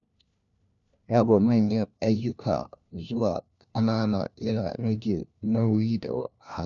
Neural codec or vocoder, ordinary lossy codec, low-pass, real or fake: codec, 16 kHz, 1 kbps, FunCodec, trained on LibriTTS, 50 frames a second; none; 7.2 kHz; fake